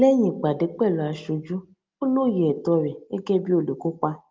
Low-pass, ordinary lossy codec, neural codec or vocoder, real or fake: 7.2 kHz; Opus, 32 kbps; none; real